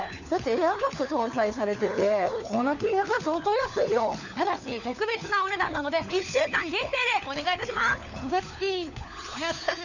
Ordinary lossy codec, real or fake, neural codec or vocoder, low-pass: none; fake; codec, 16 kHz, 4 kbps, FunCodec, trained on LibriTTS, 50 frames a second; 7.2 kHz